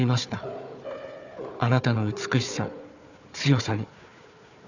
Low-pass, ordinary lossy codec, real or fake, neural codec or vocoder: 7.2 kHz; none; fake; codec, 16 kHz, 4 kbps, FunCodec, trained on Chinese and English, 50 frames a second